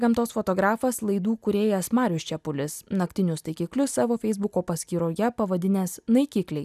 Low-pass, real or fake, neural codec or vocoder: 14.4 kHz; real; none